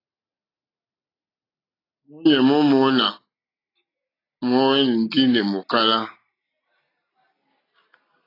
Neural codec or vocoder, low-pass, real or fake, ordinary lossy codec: none; 5.4 kHz; real; AAC, 24 kbps